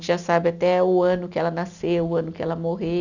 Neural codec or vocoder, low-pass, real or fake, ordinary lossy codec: none; 7.2 kHz; real; none